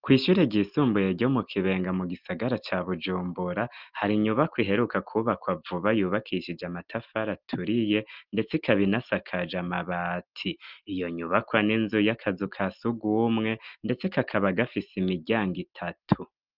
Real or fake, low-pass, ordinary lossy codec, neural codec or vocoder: real; 5.4 kHz; Opus, 32 kbps; none